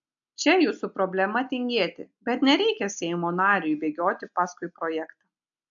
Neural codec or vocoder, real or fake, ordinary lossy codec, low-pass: none; real; AAC, 64 kbps; 7.2 kHz